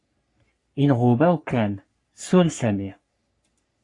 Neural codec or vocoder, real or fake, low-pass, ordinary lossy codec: codec, 44.1 kHz, 3.4 kbps, Pupu-Codec; fake; 10.8 kHz; AAC, 48 kbps